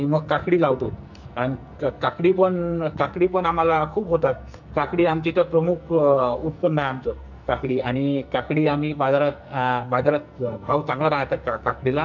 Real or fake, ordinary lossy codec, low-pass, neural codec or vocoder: fake; none; 7.2 kHz; codec, 44.1 kHz, 2.6 kbps, SNAC